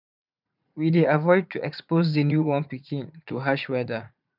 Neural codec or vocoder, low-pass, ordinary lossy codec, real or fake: vocoder, 44.1 kHz, 80 mel bands, Vocos; 5.4 kHz; none; fake